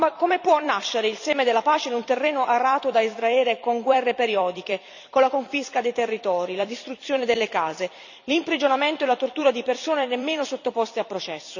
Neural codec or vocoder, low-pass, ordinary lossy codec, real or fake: vocoder, 44.1 kHz, 128 mel bands every 256 samples, BigVGAN v2; 7.2 kHz; none; fake